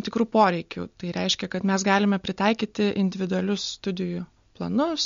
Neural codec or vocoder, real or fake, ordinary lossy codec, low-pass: none; real; MP3, 48 kbps; 7.2 kHz